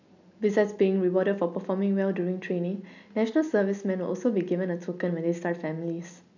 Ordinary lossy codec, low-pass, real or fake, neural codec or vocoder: none; 7.2 kHz; real; none